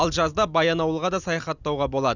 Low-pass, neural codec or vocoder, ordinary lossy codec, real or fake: 7.2 kHz; none; none; real